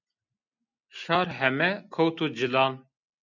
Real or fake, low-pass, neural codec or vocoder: real; 7.2 kHz; none